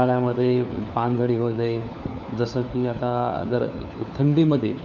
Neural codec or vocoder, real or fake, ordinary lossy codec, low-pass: codec, 16 kHz, 4 kbps, FunCodec, trained on LibriTTS, 50 frames a second; fake; none; 7.2 kHz